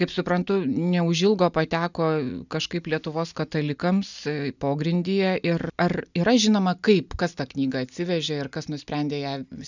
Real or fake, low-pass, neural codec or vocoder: real; 7.2 kHz; none